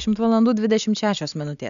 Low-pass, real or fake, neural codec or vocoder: 7.2 kHz; real; none